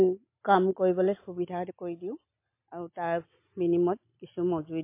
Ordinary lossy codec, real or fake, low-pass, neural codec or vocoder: MP3, 24 kbps; real; 3.6 kHz; none